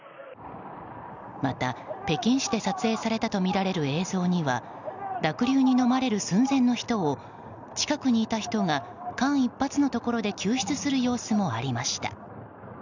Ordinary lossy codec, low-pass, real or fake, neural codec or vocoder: none; 7.2 kHz; real; none